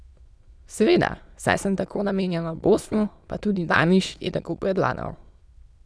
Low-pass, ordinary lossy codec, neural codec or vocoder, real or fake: none; none; autoencoder, 22.05 kHz, a latent of 192 numbers a frame, VITS, trained on many speakers; fake